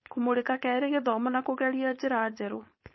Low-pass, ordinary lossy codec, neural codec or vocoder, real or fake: 7.2 kHz; MP3, 24 kbps; codec, 16 kHz, 4.8 kbps, FACodec; fake